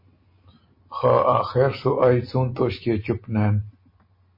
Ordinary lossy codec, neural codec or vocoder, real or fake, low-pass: MP3, 24 kbps; none; real; 5.4 kHz